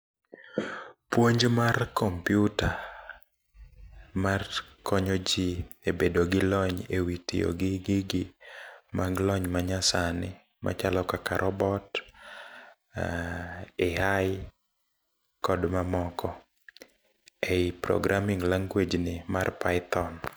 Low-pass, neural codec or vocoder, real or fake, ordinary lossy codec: none; none; real; none